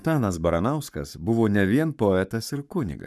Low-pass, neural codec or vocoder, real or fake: 14.4 kHz; codec, 44.1 kHz, 7.8 kbps, Pupu-Codec; fake